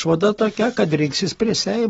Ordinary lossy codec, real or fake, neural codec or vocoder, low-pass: AAC, 24 kbps; real; none; 19.8 kHz